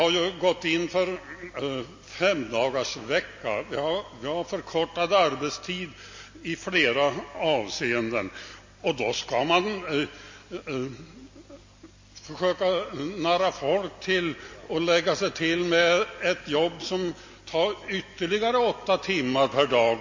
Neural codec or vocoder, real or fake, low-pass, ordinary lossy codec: none; real; 7.2 kHz; MP3, 32 kbps